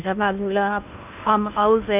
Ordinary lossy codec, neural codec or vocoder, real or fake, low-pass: none; codec, 16 kHz in and 24 kHz out, 0.8 kbps, FocalCodec, streaming, 65536 codes; fake; 3.6 kHz